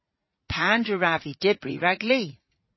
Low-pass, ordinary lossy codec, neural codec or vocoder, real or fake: 7.2 kHz; MP3, 24 kbps; vocoder, 44.1 kHz, 80 mel bands, Vocos; fake